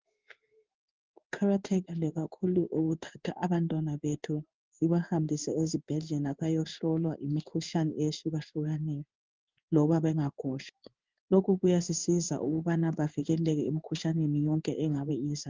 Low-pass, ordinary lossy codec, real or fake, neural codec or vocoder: 7.2 kHz; Opus, 32 kbps; fake; codec, 16 kHz in and 24 kHz out, 1 kbps, XY-Tokenizer